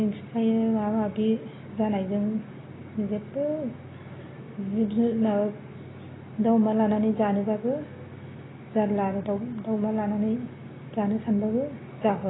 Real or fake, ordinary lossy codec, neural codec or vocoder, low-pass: real; AAC, 16 kbps; none; 7.2 kHz